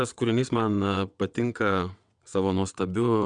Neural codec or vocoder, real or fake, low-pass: vocoder, 22.05 kHz, 80 mel bands, WaveNeXt; fake; 9.9 kHz